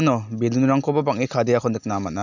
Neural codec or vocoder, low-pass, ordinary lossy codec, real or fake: none; 7.2 kHz; none; real